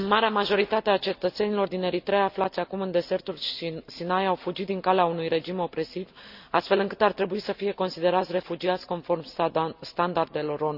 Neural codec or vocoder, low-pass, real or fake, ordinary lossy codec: none; 5.4 kHz; real; none